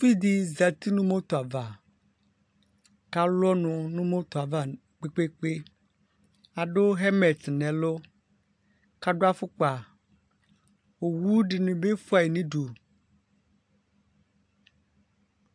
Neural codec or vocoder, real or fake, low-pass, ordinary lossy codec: none; real; 9.9 kHz; AAC, 64 kbps